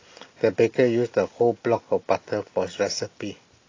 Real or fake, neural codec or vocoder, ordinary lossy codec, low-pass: real; none; AAC, 32 kbps; 7.2 kHz